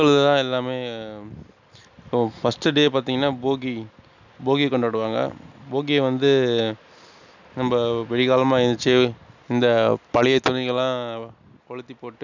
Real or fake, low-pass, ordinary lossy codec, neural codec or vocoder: real; 7.2 kHz; none; none